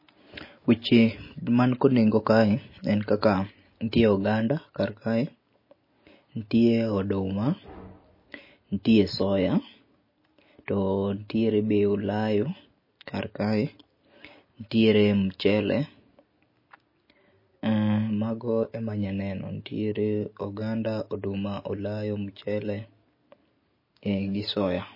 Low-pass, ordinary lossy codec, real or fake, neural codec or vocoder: 5.4 kHz; MP3, 24 kbps; real; none